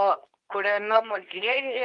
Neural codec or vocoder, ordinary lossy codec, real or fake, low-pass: codec, 24 kHz, 0.9 kbps, WavTokenizer, medium speech release version 2; Opus, 24 kbps; fake; 10.8 kHz